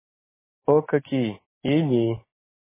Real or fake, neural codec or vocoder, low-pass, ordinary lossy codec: real; none; 3.6 kHz; MP3, 16 kbps